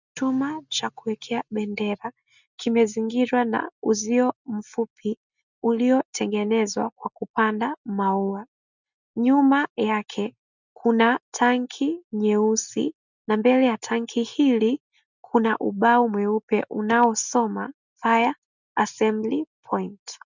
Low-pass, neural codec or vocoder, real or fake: 7.2 kHz; none; real